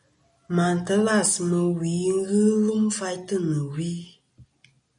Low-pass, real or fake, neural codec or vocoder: 9.9 kHz; real; none